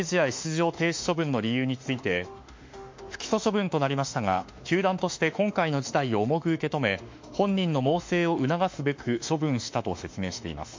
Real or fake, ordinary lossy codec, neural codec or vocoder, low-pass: fake; MP3, 48 kbps; autoencoder, 48 kHz, 32 numbers a frame, DAC-VAE, trained on Japanese speech; 7.2 kHz